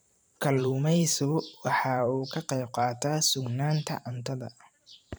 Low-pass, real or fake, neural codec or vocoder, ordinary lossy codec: none; fake; vocoder, 44.1 kHz, 128 mel bands every 512 samples, BigVGAN v2; none